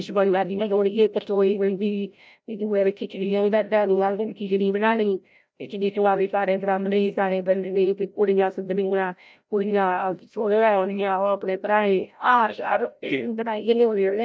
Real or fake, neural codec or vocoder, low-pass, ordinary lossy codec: fake; codec, 16 kHz, 0.5 kbps, FreqCodec, larger model; none; none